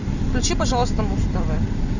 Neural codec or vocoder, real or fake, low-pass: none; real; 7.2 kHz